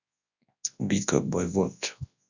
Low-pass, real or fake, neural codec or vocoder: 7.2 kHz; fake; codec, 24 kHz, 0.9 kbps, WavTokenizer, large speech release